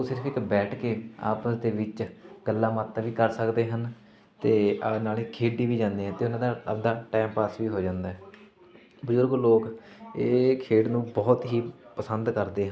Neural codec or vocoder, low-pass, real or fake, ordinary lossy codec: none; none; real; none